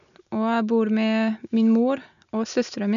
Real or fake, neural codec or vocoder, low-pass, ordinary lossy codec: real; none; 7.2 kHz; none